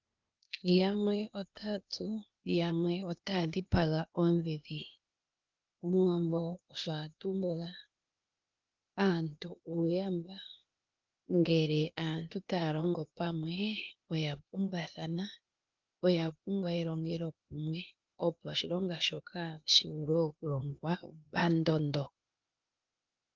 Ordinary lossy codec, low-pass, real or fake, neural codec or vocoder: Opus, 32 kbps; 7.2 kHz; fake; codec, 16 kHz, 0.8 kbps, ZipCodec